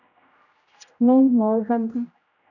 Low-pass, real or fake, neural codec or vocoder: 7.2 kHz; fake; codec, 16 kHz, 0.5 kbps, X-Codec, HuBERT features, trained on general audio